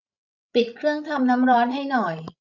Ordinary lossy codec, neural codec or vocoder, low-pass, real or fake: none; codec, 16 kHz, 16 kbps, FreqCodec, larger model; 7.2 kHz; fake